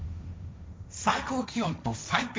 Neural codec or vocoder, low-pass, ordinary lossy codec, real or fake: codec, 16 kHz, 1.1 kbps, Voila-Tokenizer; none; none; fake